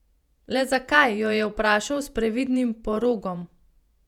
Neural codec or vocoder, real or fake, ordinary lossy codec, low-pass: vocoder, 48 kHz, 128 mel bands, Vocos; fake; none; 19.8 kHz